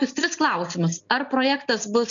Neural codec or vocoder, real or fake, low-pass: none; real; 7.2 kHz